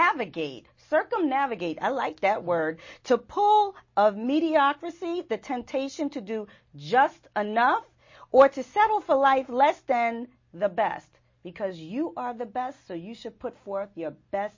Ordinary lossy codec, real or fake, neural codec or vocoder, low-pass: MP3, 32 kbps; real; none; 7.2 kHz